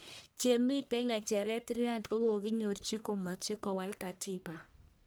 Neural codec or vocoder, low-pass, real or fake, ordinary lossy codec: codec, 44.1 kHz, 1.7 kbps, Pupu-Codec; none; fake; none